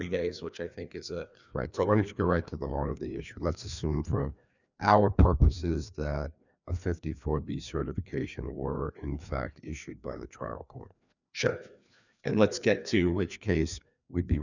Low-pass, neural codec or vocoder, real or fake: 7.2 kHz; codec, 16 kHz, 2 kbps, FreqCodec, larger model; fake